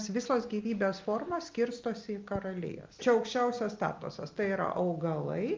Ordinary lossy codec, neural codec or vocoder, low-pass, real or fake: Opus, 24 kbps; none; 7.2 kHz; real